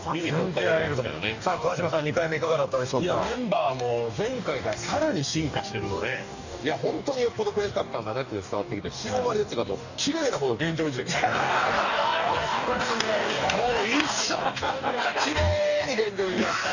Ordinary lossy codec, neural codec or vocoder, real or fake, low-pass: none; codec, 44.1 kHz, 2.6 kbps, DAC; fake; 7.2 kHz